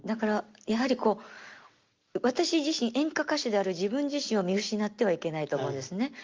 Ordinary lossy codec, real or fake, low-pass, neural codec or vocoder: Opus, 32 kbps; real; 7.2 kHz; none